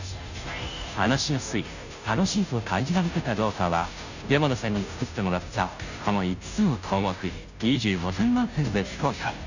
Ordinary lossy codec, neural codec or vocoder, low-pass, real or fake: none; codec, 16 kHz, 0.5 kbps, FunCodec, trained on Chinese and English, 25 frames a second; 7.2 kHz; fake